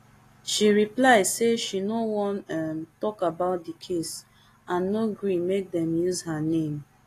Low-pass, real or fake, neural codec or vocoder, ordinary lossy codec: 14.4 kHz; real; none; AAC, 48 kbps